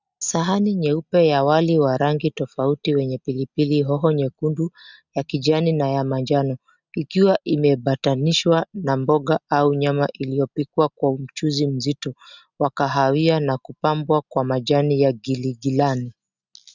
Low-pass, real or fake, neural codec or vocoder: 7.2 kHz; real; none